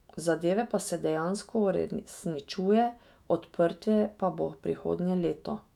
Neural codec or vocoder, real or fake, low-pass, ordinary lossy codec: autoencoder, 48 kHz, 128 numbers a frame, DAC-VAE, trained on Japanese speech; fake; 19.8 kHz; none